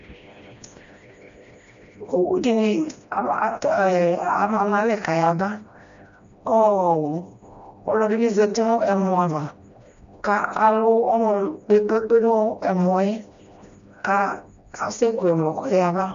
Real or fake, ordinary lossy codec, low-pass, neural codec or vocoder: fake; MP3, 64 kbps; 7.2 kHz; codec, 16 kHz, 1 kbps, FreqCodec, smaller model